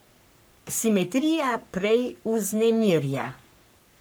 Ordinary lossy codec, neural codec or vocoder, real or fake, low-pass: none; codec, 44.1 kHz, 3.4 kbps, Pupu-Codec; fake; none